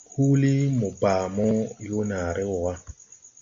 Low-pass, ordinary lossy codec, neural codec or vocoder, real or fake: 7.2 kHz; MP3, 48 kbps; none; real